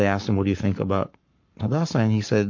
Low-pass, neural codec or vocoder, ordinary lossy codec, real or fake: 7.2 kHz; codec, 44.1 kHz, 7.8 kbps, Pupu-Codec; MP3, 48 kbps; fake